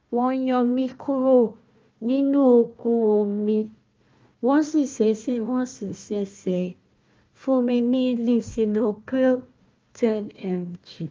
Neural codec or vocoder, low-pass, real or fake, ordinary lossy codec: codec, 16 kHz, 1 kbps, FunCodec, trained on Chinese and English, 50 frames a second; 7.2 kHz; fake; Opus, 24 kbps